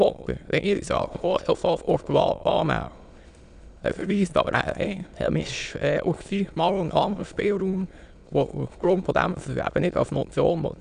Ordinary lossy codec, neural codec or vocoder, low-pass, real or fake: none; autoencoder, 22.05 kHz, a latent of 192 numbers a frame, VITS, trained on many speakers; 9.9 kHz; fake